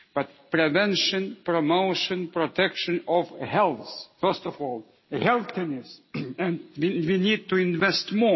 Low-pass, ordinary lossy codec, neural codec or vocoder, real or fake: 7.2 kHz; MP3, 24 kbps; none; real